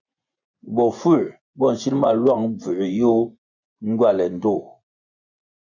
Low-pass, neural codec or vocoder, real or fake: 7.2 kHz; vocoder, 24 kHz, 100 mel bands, Vocos; fake